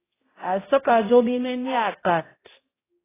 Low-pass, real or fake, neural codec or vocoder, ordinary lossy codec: 3.6 kHz; fake; codec, 16 kHz, 0.5 kbps, X-Codec, HuBERT features, trained on balanced general audio; AAC, 16 kbps